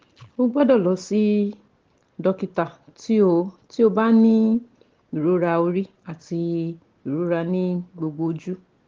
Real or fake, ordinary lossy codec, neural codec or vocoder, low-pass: real; Opus, 16 kbps; none; 7.2 kHz